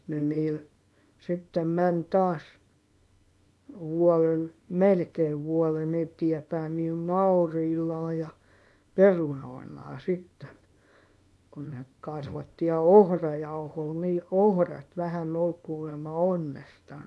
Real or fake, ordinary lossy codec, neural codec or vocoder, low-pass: fake; none; codec, 24 kHz, 0.9 kbps, WavTokenizer, small release; none